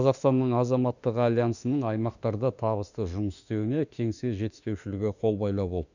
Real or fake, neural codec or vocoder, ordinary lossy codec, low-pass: fake; autoencoder, 48 kHz, 32 numbers a frame, DAC-VAE, trained on Japanese speech; none; 7.2 kHz